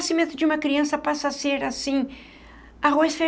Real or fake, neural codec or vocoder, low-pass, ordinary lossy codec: real; none; none; none